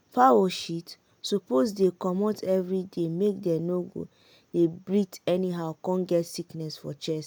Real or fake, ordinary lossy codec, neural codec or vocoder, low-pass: real; none; none; none